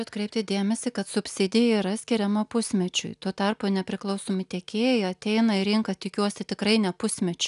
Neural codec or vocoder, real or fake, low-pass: none; real; 10.8 kHz